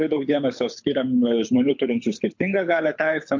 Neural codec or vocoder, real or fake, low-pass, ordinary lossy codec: codec, 24 kHz, 6 kbps, HILCodec; fake; 7.2 kHz; MP3, 48 kbps